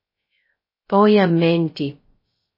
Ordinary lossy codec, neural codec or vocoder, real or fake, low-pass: MP3, 24 kbps; codec, 16 kHz, 0.2 kbps, FocalCodec; fake; 5.4 kHz